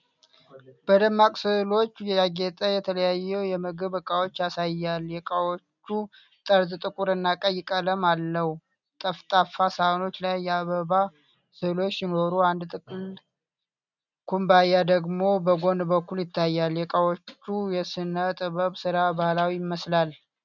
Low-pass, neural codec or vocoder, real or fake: 7.2 kHz; none; real